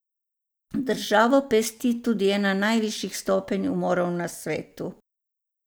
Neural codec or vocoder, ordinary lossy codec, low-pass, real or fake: none; none; none; real